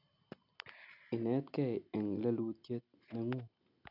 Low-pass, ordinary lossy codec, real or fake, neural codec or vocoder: 5.4 kHz; none; real; none